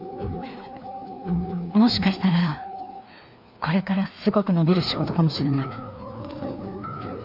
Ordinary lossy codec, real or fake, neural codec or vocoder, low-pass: none; fake; codec, 16 kHz, 2 kbps, FreqCodec, larger model; 5.4 kHz